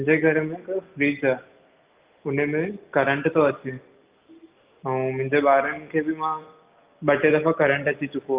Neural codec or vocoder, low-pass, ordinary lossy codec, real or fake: none; 3.6 kHz; Opus, 24 kbps; real